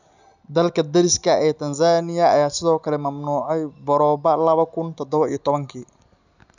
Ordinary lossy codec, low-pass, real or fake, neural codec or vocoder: none; 7.2 kHz; real; none